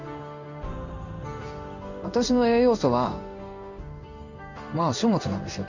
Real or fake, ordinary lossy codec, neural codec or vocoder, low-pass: fake; none; codec, 16 kHz in and 24 kHz out, 1 kbps, XY-Tokenizer; 7.2 kHz